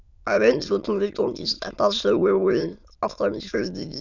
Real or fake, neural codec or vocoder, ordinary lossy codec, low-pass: fake; autoencoder, 22.05 kHz, a latent of 192 numbers a frame, VITS, trained on many speakers; none; 7.2 kHz